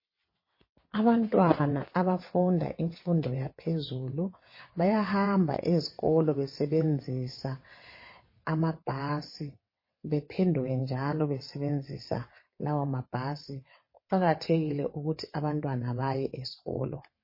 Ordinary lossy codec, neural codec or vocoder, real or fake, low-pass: MP3, 24 kbps; vocoder, 22.05 kHz, 80 mel bands, WaveNeXt; fake; 5.4 kHz